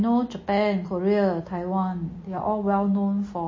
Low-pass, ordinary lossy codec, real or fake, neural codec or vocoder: 7.2 kHz; MP3, 32 kbps; real; none